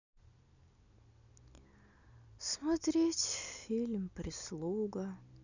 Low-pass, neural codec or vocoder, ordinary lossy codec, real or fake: 7.2 kHz; none; none; real